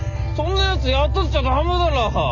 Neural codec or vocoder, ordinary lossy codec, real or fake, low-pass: none; none; real; 7.2 kHz